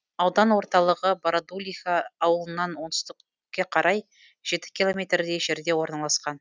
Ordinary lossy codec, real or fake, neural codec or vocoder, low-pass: none; real; none; none